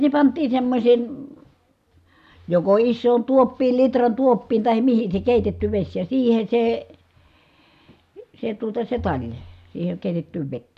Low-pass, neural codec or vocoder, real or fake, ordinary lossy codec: 14.4 kHz; none; real; AAC, 96 kbps